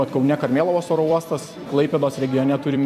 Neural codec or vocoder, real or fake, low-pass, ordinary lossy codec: vocoder, 44.1 kHz, 128 mel bands every 256 samples, BigVGAN v2; fake; 14.4 kHz; MP3, 96 kbps